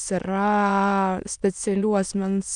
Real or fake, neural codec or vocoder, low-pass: fake; autoencoder, 22.05 kHz, a latent of 192 numbers a frame, VITS, trained on many speakers; 9.9 kHz